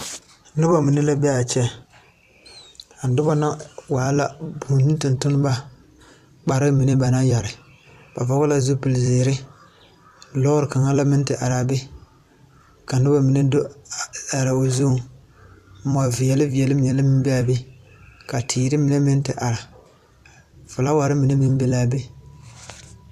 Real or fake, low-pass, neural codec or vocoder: fake; 14.4 kHz; vocoder, 44.1 kHz, 128 mel bands every 256 samples, BigVGAN v2